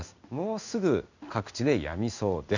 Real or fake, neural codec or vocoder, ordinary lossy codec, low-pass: fake; codec, 16 kHz in and 24 kHz out, 1 kbps, XY-Tokenizer; none; 7.2 kHz